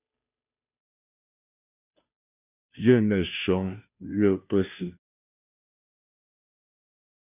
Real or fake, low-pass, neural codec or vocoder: fake; 3.6 kHz; codec, 16 kHz, 0.5 kbps, FunCodec, trained on Chinese and English, 25 frames a second